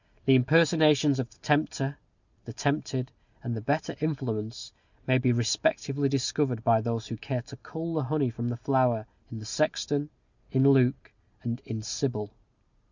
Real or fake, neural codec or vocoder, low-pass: real; none; 7.2 kHz